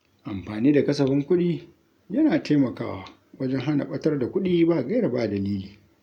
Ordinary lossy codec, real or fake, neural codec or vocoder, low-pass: none; real; none; 19.8 kHz